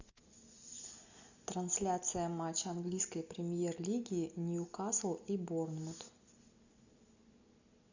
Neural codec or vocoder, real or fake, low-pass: none; real; 7.2 kHz